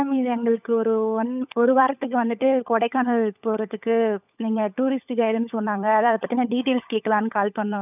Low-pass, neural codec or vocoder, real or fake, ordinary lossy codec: 3.6 kHz; codec, 16 kHz, 16 kbps, FunCodec, trained on LibriTTS, 50 frames a second; fake; none